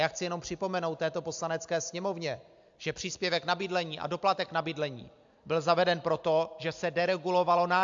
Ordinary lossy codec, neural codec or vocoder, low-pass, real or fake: AAC, 64 kbps; none; 7.2 kHz; real